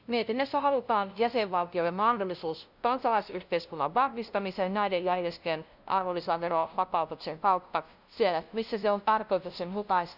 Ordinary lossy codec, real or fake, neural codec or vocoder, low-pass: none; fake; codec, 16 kHz, 0.5 kbps, FunCodec, trained on LibriTTS, 25 frames a second; 5.4 kHz